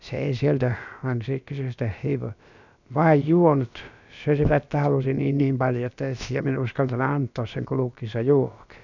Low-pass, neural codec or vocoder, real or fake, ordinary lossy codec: 7.2 kHz; codec, 16 kHz, about 1 kbps, DyCAST, with the encoder's durations; fake; none